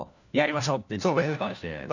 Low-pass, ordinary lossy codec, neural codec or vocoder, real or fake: 7.2 kHz; none; codec, 16 kHz, 1 kbps, FunCodec, trained on LibriTTS, 50 frames a second; fake